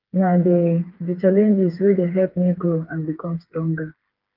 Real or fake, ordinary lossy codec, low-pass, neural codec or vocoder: fake; Opus, 32 kbps; 5.4 kHz; codec, 16 kHz, 4 kbps, FreqCodec, smaller model